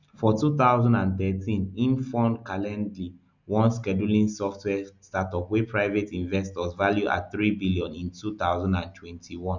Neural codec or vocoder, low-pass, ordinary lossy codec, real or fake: none; 7.2 kHz; none; real